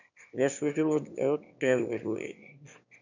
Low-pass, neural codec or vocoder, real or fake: 7.2 kHz; autoencoder, 22.05 kHz, a latent of 192 numbers a frame, VITS, trained on one speaker; fake